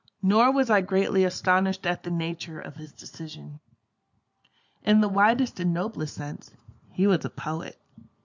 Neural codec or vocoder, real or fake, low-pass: none; real; 7.2 kHz